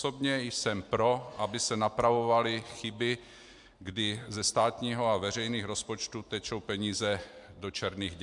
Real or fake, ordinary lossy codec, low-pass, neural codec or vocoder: real; MP3, 64 kbps; 10.8 kHz; none